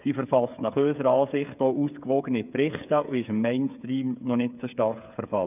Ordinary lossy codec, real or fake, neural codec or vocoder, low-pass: none; fake; codec, 16 kHz, 8 kbps, FreqCodec, smaller model; 3.6 kHz